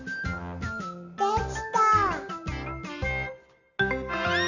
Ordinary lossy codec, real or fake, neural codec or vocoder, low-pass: none; real; none; 7.2 kHz